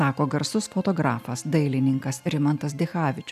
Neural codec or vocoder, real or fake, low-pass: none; real; 14.4 kHz